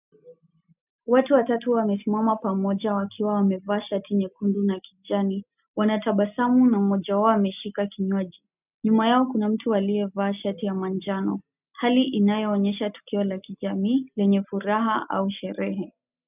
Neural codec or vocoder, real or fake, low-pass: none; real; 3.6 kHz